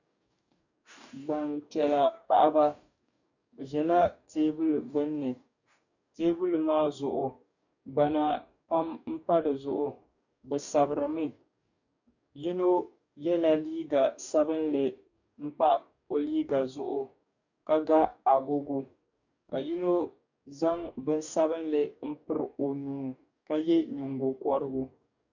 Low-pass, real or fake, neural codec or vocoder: 7.2 kHz; fake; codec, 44.1 kHz, 2.6 kbps, DAC